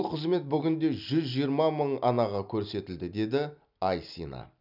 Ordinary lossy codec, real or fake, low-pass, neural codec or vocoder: none; real; 5.4 kHz; none